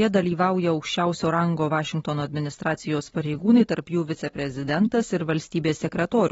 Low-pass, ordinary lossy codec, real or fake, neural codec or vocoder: 19.8 kHz; AAC, 24 kbps; real; none